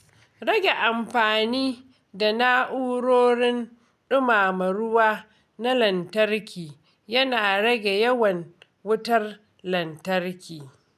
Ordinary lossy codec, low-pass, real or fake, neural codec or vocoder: none; 14.4 kHz; real; none